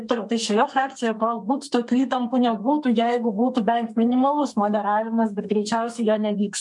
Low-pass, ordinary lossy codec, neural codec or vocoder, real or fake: 10.8 kHz; MP3, 64 kbps; codec, 32 kHz, 1.9 kbps, SNAC; fake